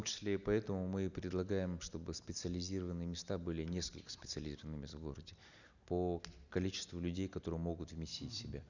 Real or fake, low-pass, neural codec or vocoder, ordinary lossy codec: real; 7.2 kHz; none; none